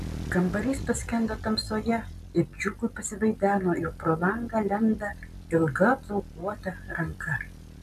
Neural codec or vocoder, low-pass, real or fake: none; 14.4 kHz; real